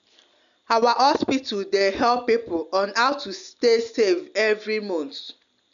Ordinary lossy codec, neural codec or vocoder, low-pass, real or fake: none; none; 7.2 kHz; real